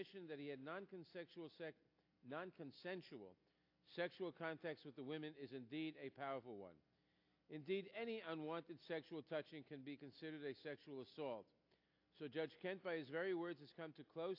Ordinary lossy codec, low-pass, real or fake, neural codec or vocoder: AAC, 48 kbps; 5.4 kHz; real; none